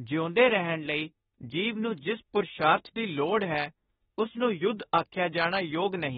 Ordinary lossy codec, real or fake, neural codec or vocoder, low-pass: AAC, 16 kbps; fake; autoencoder, 48 kHz, 32 numbers a frame, DAC-VAE, trained on Japanese speech; 19.8 kHz